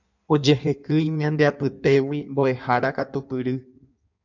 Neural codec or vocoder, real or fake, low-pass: codec, 16 kHz in and 24 kHz out, 1.1 kbps, FireRedTTS-2 codec; fake; 7.2 kHz